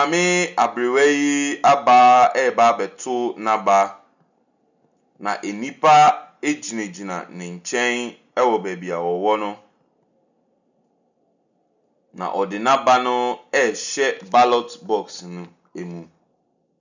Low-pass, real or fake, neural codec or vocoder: 7.2 kHz; real; none